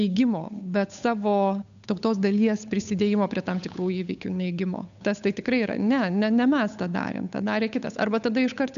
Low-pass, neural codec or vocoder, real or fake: 7.2 kHz; codec, 16 kHz, 8 kbps, FunCodec, trained on Chinese and English, 25 frames a second; fake